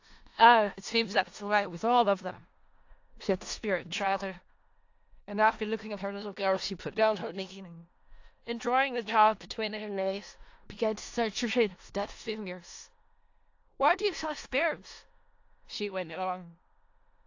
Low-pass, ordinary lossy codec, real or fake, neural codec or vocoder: 7.2 kHz; AAC, 48 kbps; fake; codec, 16 kHz in and 24 kHz out, 0.4 kbps, LongCat-Audio-Codec, four codebook decoder